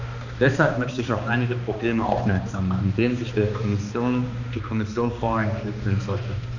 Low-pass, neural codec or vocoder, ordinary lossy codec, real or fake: 7.2 kHz; codec, 16 kHz, 2 kbps, X-Codec, HuBERT features, trained on balanced general audio; none; fake